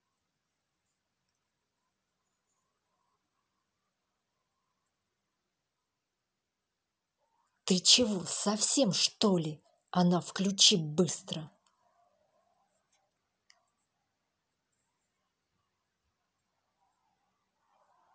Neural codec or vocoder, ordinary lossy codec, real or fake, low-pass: none; none; real; none